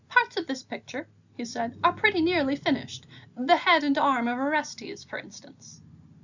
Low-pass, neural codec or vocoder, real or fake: 7.2 kHz; none; real